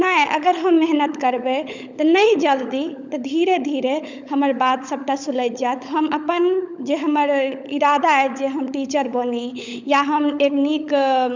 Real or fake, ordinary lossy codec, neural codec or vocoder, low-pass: fake; none; codec, 16 kHz, 16 kbps, FunCodec, trained on LibriTTS, 50 frames a second; 7.2 kHz